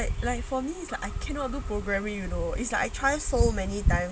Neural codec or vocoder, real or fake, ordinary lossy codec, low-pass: none; real; none; none